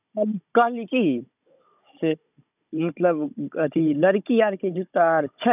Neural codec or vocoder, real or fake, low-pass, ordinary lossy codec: codec, 16 kHz, 16 kbps, FunCodec, trained on Chinese and English, 50 frames a second; fake; 3.6 kHz; none